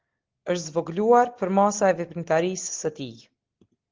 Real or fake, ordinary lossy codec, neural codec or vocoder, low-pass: real; Opus, 16 kbps; none; 7.2 kHz